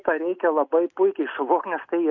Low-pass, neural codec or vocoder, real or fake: 7.2 kHz; none; real